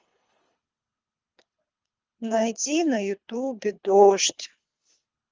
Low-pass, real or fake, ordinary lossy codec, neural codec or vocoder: 7.2 kHz; fake; Opus, 24 kbps; codec, 24 kHz, 3 kbps, HILCodec